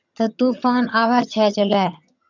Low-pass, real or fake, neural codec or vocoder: 7.2 kHz; fake; vocoder, 22.05 kHz, 80 mel bands, HiFi-GAN